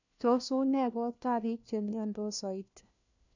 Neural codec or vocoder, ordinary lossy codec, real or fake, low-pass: codec, 16 kHz, 1 kbps, FunCodec, trained on LibriTTS, 50 frames a second; none; fake; 7.2 kHz